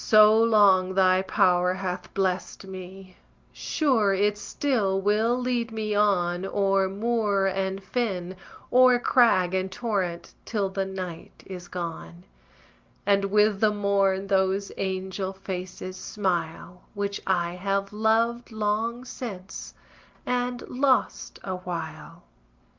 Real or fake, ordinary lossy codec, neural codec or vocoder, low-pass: real; Opus, 24 kbps; none; 7.2 kHz